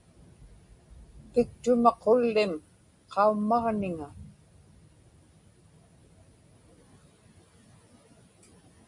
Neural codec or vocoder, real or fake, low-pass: none; real; 10.8 kHz